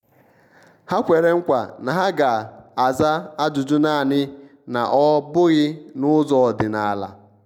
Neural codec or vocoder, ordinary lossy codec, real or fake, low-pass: none; none; real; 19.8 kHz